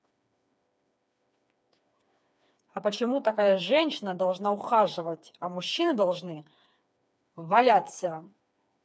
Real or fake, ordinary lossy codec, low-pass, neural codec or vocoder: fake; none; none; codec, 16 kHz, 4 kbps, FreqCodec, smaller model